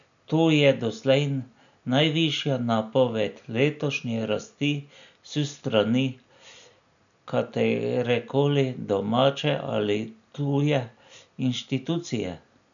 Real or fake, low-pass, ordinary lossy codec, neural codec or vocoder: real; 7.2 kHz; none; none